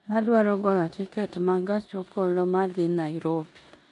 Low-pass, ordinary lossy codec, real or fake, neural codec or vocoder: 10.8 kHz; AAC, 64 kbps; fake; codec, 16 kHz in and 24 kHz out, 0.9 kbps, LongCat-Audio-Codec, four codebook decoder